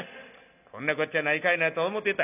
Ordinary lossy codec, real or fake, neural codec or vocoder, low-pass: none; real; none; 3.6 kHz